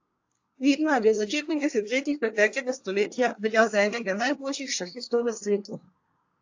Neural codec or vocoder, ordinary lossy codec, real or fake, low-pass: codec, 24 kHz, 1 kbps, SNAC; AAC, 48 kbps; fake; 7.2 kHz